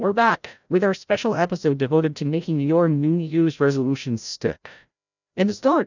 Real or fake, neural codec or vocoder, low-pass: fake; codec, 16 kHz, 0.5 kbps, FreqCodec, larger model; 7.2 kHz